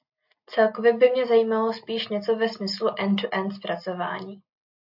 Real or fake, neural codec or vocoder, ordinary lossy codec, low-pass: real; none; AAC, 48 kbps; 5.4 kHz